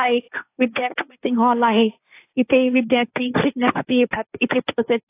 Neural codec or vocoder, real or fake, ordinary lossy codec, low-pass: codec, 16 kHz, 1.1 kbps, Voila-Tokenizer; fake; AAC, 24 kbps; 3.6 kHz